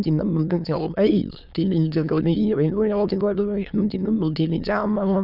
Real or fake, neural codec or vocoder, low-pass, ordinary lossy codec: fake; autoencoder, 22.05 kHz, a latent of 192 numbers a frame, VITS, trained on many speakers; 5.4 kHz; none